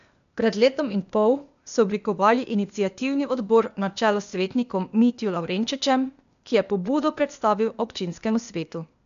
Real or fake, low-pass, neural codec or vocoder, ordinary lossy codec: fake; 7.2 kHz; codec, 16 kHz, 0.8 kbps, ZipCodec; none